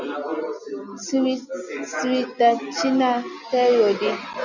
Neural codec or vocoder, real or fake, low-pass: none; real; 7.2 kHz